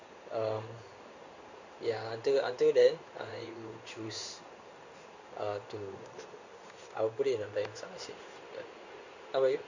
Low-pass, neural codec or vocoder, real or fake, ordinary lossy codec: 7.2 kHz; codec, 16 kHz in and 24 kHz out, 1 kbps, XY-Tokenizer; fake; Opus, 64 kbps